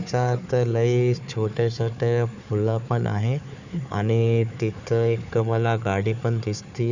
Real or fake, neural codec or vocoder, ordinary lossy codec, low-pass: fake; codec, 16 kHz, 4 kbps, FunCodec, trained on Chinese and English, 50 frames a second; none; 7.2 kHz